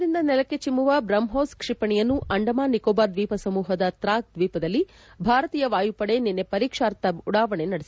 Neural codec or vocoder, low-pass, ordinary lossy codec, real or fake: none; none; none; real